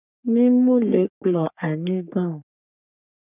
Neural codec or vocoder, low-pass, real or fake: codec, 44.1 kHz, 3.4 kbps, Pupu-Codec; 3.6 kHz; fake